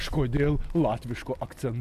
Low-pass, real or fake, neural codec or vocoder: 14.4 kHz; real; none